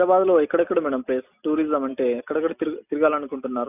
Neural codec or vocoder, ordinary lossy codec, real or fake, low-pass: none; none; real; 3.6 kHz